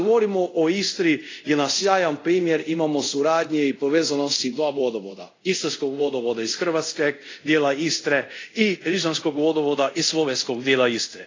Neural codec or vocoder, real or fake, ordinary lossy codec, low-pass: codec, 24 kHz, 0.5 kbps, DualCodec; fake; AAC, 32 kbps; 7.2 kHz